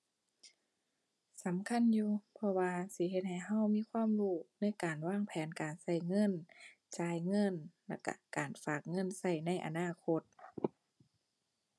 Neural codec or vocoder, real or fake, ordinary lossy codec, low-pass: none; real; none; none